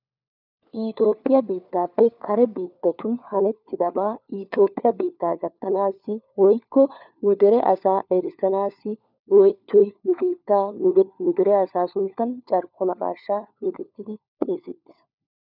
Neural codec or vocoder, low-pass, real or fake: codec, 16 kHz, 4 kbps, FunCodec, trained on LibriTTS, 50 frames a second; 5.4 kHz; fake